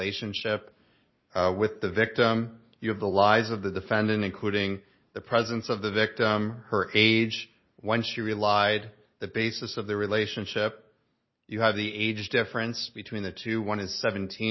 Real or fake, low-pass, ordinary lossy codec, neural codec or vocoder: real; 7.2 kHz; MP3, 24 kbps; none